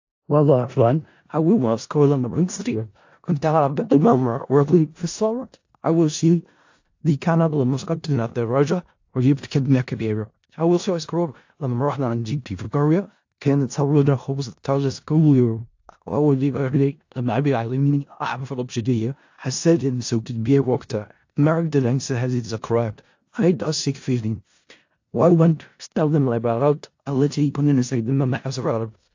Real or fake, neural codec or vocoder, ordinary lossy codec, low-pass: fake; codec, 16 kHz in and 24 kHz out, 0.4 kbps, LongCat-Audio-Codec, four codebook decoder; AAC, 48 kbps; 7.2 kHz